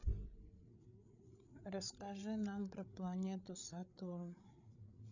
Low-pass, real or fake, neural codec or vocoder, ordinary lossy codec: 7.2 kHz; fake; codec, 16 kHz, 8 kbps, FreqCodec, larger model; none